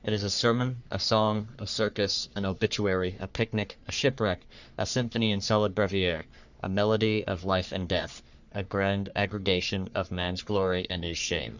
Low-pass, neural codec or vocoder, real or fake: 7.2 kHz; codec, 44.1 kHz, 3.4 kbps, Pupu-Codec; fake